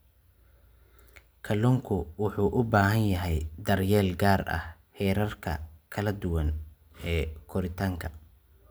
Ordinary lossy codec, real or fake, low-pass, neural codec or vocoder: none; real; none; none